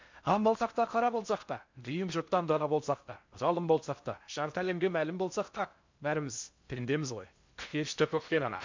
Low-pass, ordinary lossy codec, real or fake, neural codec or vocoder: 7.2 kHz; MP3, 64 kbps; fake; codec, 16 kHz in and 24 kHz out, 0.6 kbps, FocalCodec, streaming, 4096 codes